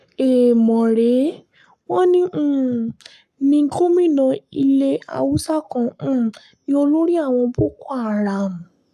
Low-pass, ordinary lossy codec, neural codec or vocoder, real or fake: 14.4 kHz; none; codec, 44.1 kHz, 7.8 kbps, Pupu-Codec; fake